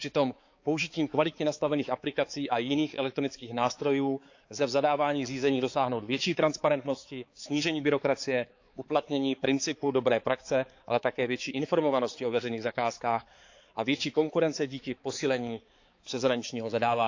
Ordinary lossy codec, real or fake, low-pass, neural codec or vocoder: AAC, 48 kbps; fake; 7.2 kHz; codec, 16 kHz, 4 kbps, X-Codec, HuBERT features, trained on balanced general audio